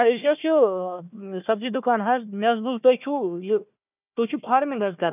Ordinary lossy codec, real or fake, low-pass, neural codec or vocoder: none; fake; 3.6 kHz; codec, 16 kHz, 1 kbps, FunCodec, trained on Chinese and English, 50 frames a second